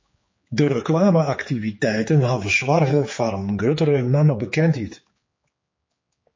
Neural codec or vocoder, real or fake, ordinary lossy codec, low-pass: codec, 16 kHz, 4 kbps, X-Codec, HuBERT features, trained on general audio; fake; MP3, 32 kbps; 7.2 kHz